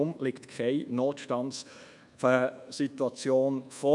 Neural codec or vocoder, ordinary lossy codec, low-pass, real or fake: codec, 24 kHz, 1.2 kbps, DualCodec; none; 10.8 kHz; fake